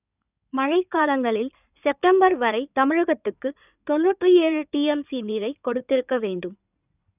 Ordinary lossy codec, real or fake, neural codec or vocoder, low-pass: none; fake; codec, 16 kHz in and 24 kHz out, 2.2 kbps, FireRedTTS-2 codec; 3.6 kHz